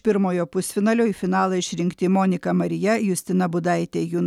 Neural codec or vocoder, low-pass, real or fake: none; 19.8 kHz; real